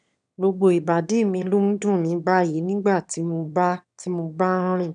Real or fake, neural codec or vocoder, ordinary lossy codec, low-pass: fake; autoencoder, 22.05 kHz, a latent of 192 numbers a frame, VITS, trained on one speaker; none; 9.9 kHz